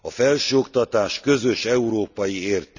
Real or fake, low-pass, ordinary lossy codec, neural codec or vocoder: real; 7.2 kHz; none; none